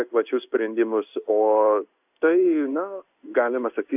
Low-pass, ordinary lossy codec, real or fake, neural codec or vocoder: 3.6 kHz; AAC, 32 kbps; fake; codec, 16 kHz in and 24 kHz out, 1 kbps, XY-Tokenizer